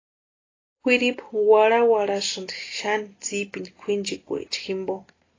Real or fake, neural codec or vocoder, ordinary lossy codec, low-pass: real; none; AAC, 32 kbps; 7.2 kHz